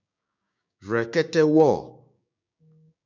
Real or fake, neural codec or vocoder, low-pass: fake; codec, 16 kHz, 6 kbps, DAC; 7.2 kHz